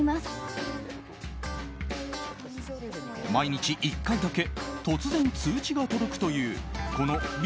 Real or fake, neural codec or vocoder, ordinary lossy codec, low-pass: real; none; none; none